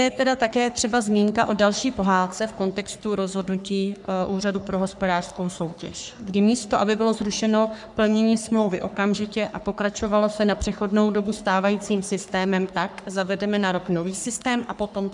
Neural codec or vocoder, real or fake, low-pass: codec, 44.1 kHz, 3.4 kbps, Pupu-Codec; fake; 10.8 kHz